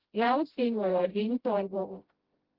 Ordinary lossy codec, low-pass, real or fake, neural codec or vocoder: Opus, 16 kbps; 5.4 kHz; fake; codec, 16 kHz, 0.5 kbps, FreqCodec, smaller model